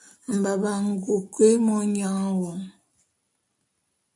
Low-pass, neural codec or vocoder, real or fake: 10.8 kHz; none; real